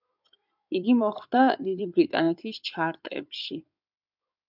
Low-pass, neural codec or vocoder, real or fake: 5.4 kHz; codec, 16 kHz in and 24 kHz out, 2.2 kbps, FireRedTTS-2 codec; fake